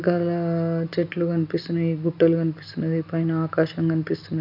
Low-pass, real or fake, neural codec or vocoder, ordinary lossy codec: 5.4 kHz; real; none; none